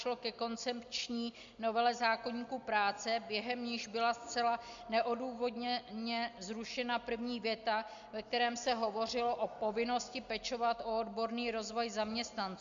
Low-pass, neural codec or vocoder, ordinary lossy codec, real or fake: 7.2 kHz; none; MP3, 64 kbps; real